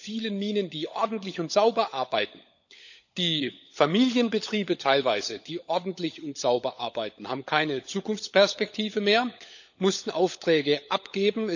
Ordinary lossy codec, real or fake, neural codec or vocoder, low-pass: none; fake; codec, 16 kHz, 16 kbps, FunCodec, trained on LibriTTS, 50 frames a second; 7.2 kHz